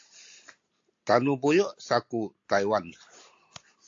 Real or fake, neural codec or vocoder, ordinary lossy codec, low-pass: real; none; AAC, 48 kbps; 7.2 kHz